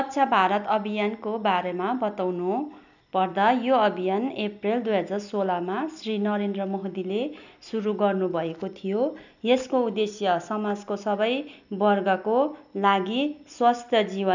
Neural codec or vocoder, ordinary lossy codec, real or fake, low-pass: none; none; real; 7.2 kHz